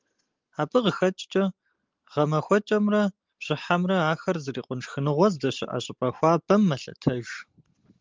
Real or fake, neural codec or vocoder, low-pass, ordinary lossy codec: real; none; 7.2 kHz; Opus, 32 kbps